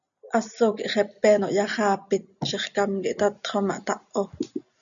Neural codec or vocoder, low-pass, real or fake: none; 7.2 kHz; real